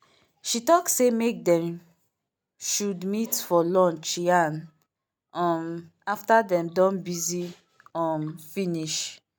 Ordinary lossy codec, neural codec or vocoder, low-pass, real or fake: none; none; none; real